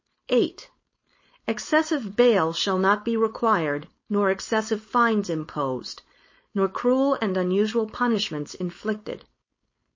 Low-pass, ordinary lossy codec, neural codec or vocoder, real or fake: 7.2 kHz; MP3, 32 kbps; codec, 16 kHz, 4.8 kbps, FACodec; fake